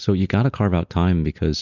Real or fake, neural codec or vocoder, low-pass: real; none; 7.2 kHz